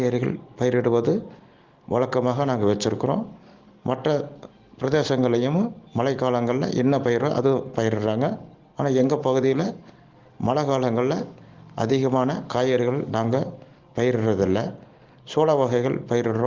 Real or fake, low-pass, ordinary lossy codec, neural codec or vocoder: real; 7.2 kHz; Opus, 16 kbps; none